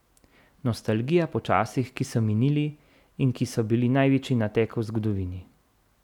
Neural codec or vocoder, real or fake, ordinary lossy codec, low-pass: none; real; none; 19.8 kHz